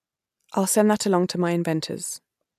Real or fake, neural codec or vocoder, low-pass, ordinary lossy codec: real; none; 14.4 kHz; MP3, 96 kbps